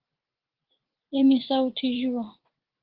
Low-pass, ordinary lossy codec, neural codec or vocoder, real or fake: 5.4 kHz; Opus, 16 kbps; vocoder, 44.1 kHz, 80 mel bands, Vocos; fake